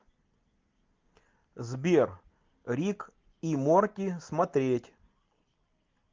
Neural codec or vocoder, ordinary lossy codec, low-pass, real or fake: none; Opus, 24 kbps; 7.2 kHz; real